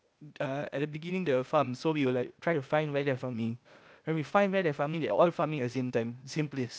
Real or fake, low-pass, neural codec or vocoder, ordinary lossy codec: fake; none; codec, 16 kHz, 0.8 kbps, ZipCodec; none